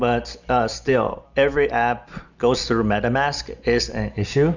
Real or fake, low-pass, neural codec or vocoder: real; 7.2 kHz; none